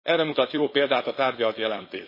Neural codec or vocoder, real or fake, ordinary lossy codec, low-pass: codec, 16 kHz, 4.8 kbps, FACodec; fake; MP3, 24 kbps; 5.4 kHz